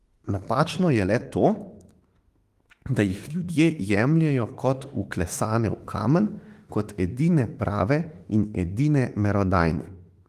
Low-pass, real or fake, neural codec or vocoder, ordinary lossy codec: 14.4 kHz; fake; autoencoder, 48 kHz, 32 numbers a frame, DAC-VAE, trained on Japanese speech; Opus, 24 kbps